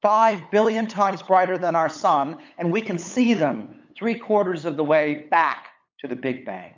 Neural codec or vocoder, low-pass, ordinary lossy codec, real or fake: codec, 16 kHz, 8 kbps, FunCodec, trained on LibriTTS, 25 frames a second; 7.2 kHz; MP3, 64 kbps; fake